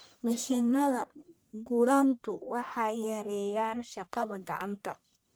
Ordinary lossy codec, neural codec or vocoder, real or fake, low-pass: none; codec, 44.1 kHz, 1.7 kbps, Pupu-Codec; fake; none